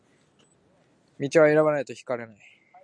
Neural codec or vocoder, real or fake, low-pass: none; real; 9.9 kHz